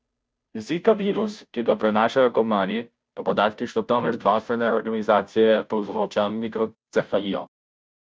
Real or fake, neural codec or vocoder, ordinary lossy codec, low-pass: fake; codec, 16 kHz, 0.5 kbps, FunCodec, trained on Chinese and English, 25 frames a second; none; none